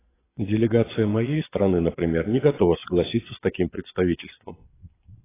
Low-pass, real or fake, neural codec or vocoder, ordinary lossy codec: 3.6 kHz; fake; vocoder, 22.05 kHz, 80 mel bands, Vocos; AAC, 16 kbps